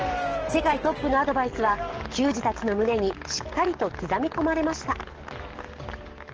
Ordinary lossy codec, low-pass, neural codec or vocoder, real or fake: Opus, 16 kbps; 7.2 kHz; codec, 44.1 kHz, 7.8 kbps, DAC; fake